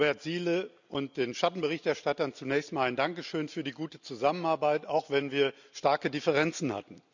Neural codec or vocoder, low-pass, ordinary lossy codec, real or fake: none; 7.2 kHz; none; real